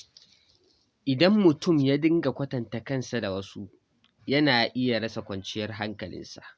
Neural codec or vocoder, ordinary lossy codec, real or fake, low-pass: none; none; real; none